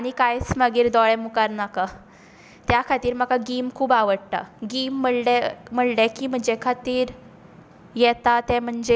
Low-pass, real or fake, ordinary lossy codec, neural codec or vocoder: none; real; none; none